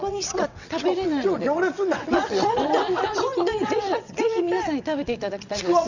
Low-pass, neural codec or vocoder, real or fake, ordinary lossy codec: 7.2 kHz; vocoder, 22.05 kHz, 80 mel bands, WaveNeXt; fake; none